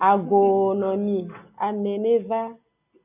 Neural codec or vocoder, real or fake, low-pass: none; real; 3.6 kHz